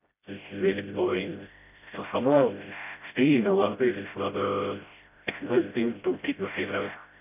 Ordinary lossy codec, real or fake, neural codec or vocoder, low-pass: none; fake; codec, 16 kHz, 0.5 kbps, FreqCodec, smaller model; 3.6 kHz